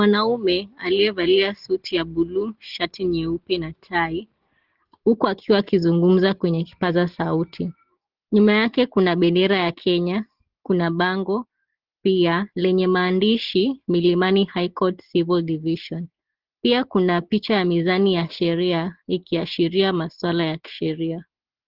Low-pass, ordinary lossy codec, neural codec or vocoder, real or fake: 5.4 kHz; Opus, 16 kbps; none; real